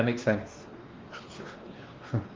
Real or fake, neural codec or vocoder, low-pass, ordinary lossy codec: fake; codec, 16 kHz, 2 kbps, X-Codec, WavLM features, trained on Multilingual LibriSpeech; 7.2 kHz; Opus, 16 kbps